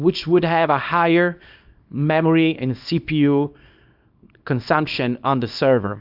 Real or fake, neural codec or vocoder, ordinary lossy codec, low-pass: fake; codec, 24 kHz, 0.9 kbps, WavTokenizer, small release; AAC, 48 kbps; 5.4 kHz